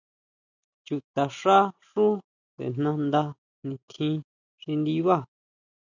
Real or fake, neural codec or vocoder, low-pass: real; none; 7.2 kHz